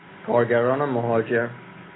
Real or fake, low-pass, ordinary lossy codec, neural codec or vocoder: real; 7.2 kHz; AAC, 16 kbps; none